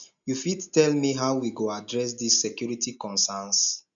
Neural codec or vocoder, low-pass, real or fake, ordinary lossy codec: none; 7.2 kHz; real; none